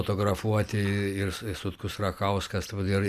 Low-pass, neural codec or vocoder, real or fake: 14.4 kHz; none; real